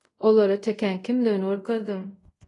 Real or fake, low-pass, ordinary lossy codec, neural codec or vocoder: fake; 10.8 kHz; AAC, 32 kbps; codec, 24 kHz, 0.5 kbps, DualCodec